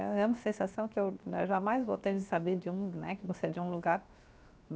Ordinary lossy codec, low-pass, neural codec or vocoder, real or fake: none; none; codec, 16 kHz, 0.7 kbps, FocalCodec; fake